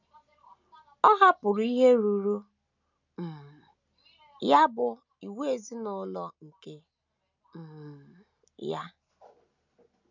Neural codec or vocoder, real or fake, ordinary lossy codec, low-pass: none; real; none; 7.2 kHz